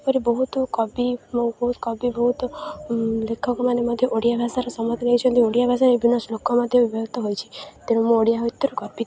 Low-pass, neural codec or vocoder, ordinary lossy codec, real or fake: none; none; none; real